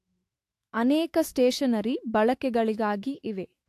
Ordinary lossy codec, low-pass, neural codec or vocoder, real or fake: AAC, 64 kbps; 14.4 kHz; autoencoder, 48 kHz, 128 numbers a frame, DAC-VAE, trained on Japanese speech; fake